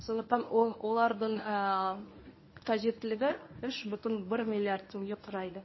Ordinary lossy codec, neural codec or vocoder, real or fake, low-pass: MP3, 24 kbps; codec, 24 kHz, 0.9 kbps, WavTokenizer, medium speech release version 1; fake; 7.2 kHz